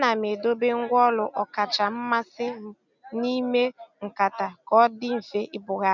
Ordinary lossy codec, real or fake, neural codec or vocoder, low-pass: none; real; none; 7.2 kHz